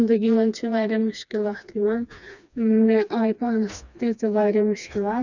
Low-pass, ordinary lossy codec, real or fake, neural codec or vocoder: 7.2 kHz; none; fake; codec, 16 kHz, 2 kbps, FreqCodec, smaller model